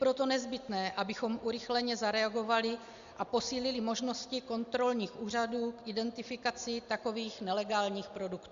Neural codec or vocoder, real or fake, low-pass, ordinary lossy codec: none; real; 7.2 kHz; Opus, 64 kbps